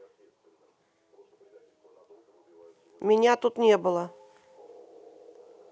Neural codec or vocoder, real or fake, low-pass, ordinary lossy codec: none; real; none; none